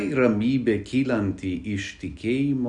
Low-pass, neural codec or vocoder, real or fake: 10.8 kHz; none; real